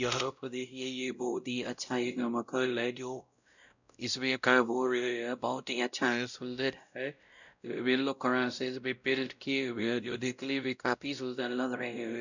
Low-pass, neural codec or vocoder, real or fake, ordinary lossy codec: 7.2 kHz; codec, 16 kHz, 0.5 kbps, X-Codec, WavLM features, trained on Multilingual LibriSpeech; fake; none